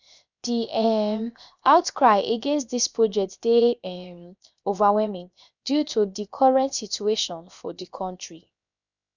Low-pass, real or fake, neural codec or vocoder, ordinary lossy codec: 7.2 kHz; fake; codec, 16 kHz, 0.7 kbps, FocalCodec; none